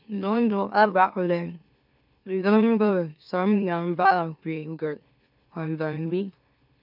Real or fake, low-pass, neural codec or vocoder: fake; 5.4 kHz; autoencoder, 44.1 kHz, a latent of 192 numbers a frame, MeloTTS